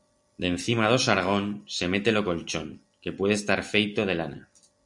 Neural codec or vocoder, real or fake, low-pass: none; real; 10.8 kHz